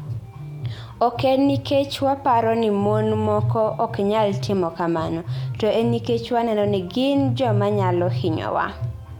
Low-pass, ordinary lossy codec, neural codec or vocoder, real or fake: 19.8 kHz; MP3, 96 kbps; none; real